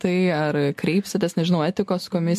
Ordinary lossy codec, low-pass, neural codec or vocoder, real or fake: MP3, 64 kbps; 14.4 kHz; none; real